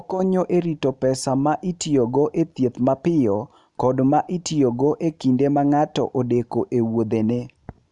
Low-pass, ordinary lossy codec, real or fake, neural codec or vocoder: 10.8 kHz; none; real; none